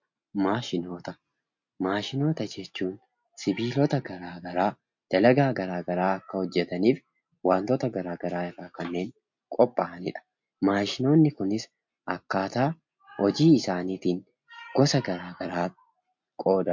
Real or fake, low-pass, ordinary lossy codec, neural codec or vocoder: real; 7.2 kHz; MP3, 48 kbps; none